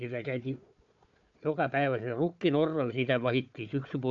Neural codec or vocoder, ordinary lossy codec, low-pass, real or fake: codec, 16 kHz, 4 kbps, FunCodec, trained on Chinese and English, 50 frames a second; AAC, 64 kbps; 7.2 kHz; fake